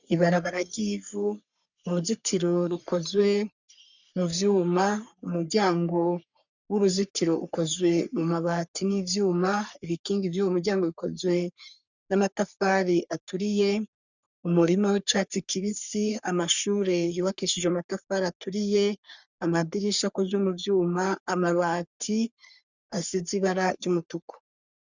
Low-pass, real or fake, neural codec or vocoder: 7.2 kHz; fake; codec, 44.1 kHz, 3.4 kbps, Pupu-Codec